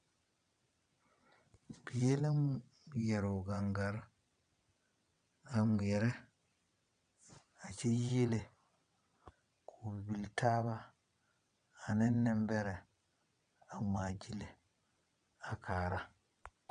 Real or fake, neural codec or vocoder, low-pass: fake; vocoder, 22.05 kHz, 80 mel bands, WaveNeXt; 9.9 kHz